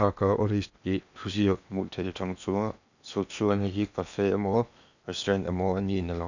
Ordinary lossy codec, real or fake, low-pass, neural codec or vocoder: none; fake; 7.2 kHz; codec, 16 kHz in and 24 kHz out, 0.8 kbps, FocalCodec, streaming, 65536 codes